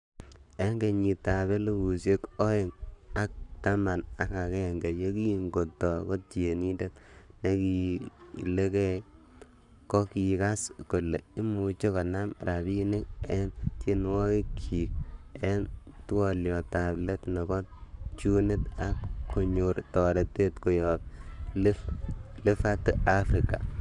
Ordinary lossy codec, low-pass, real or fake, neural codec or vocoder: none; 10.8 kHz; fake; codec, 44.1 kHz, 7.8 kbps, Pupu-Codec